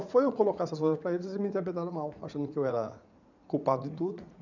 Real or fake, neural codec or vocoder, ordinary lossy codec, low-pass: fake; vocoder, 44.1 kHz, 80 mel bands, Vocos; none; 7.2 kHz